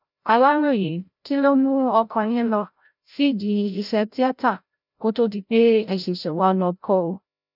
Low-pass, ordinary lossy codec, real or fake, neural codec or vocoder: 5.4 kHz; none; fake; codec, 16 kHz, 0.5 kbps, FreqCodec, larger model